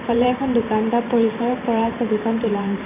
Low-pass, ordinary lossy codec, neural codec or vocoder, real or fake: 3.6 kHz; none; vocoder, 44.1 kHz, 128 mel bands every 512 samples, BigVGAN v2; fake